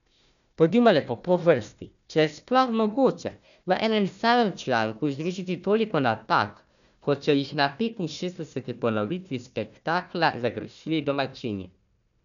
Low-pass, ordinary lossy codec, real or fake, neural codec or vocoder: 7.2 kHz; none; fake; codec, 16 kHz, 1 kbps, FunCodec, trained on Chinese and English, 50 frames a second